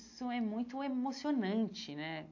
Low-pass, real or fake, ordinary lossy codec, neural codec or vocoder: 7.2 kHz; real; AAC, 48 kbps; none